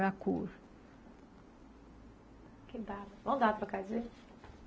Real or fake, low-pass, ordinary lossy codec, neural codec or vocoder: real; none; none; none